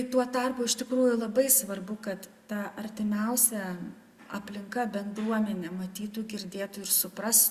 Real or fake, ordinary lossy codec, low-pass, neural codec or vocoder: real; Opus, 64 kbps; 14.4 kHz; none